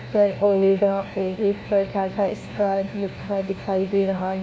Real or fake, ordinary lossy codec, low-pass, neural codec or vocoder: fake; none; none; codec, 16 kHz, 1 kbps, FunCodec, trained on LibriTTS, 50 frames a second